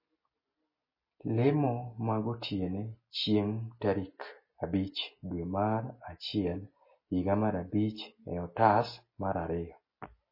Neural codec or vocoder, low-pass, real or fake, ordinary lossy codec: none; 5.4 kHz; real; MP3, 24 kbps